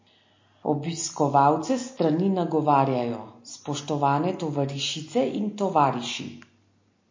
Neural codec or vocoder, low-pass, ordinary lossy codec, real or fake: none; 7.2 kHz; MP3, 32 kbps; real